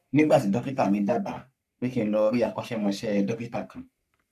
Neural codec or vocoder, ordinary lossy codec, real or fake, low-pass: codec, 44.1 kHz, 3.4 kbps, Pupu-Codec; none; fake; 14.4 kHz